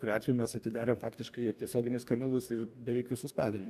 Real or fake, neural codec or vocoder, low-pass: fake; codec, 44.1 kHz, 2.6 kbps, DAC; 14.4 kHz